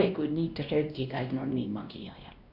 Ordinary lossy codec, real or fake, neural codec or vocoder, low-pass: none; fake; codec, 16 kHz, 1 kbps, X-Codec, WavLM features, trained on Multilingual LibriSpeech; 5.4 kHz